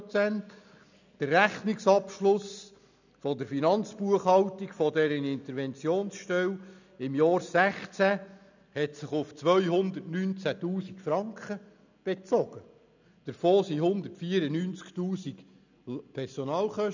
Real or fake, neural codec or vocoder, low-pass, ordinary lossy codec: real; none; 7.2 kHz; none